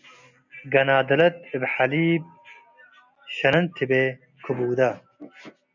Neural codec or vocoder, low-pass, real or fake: none; 7.2 kHz; real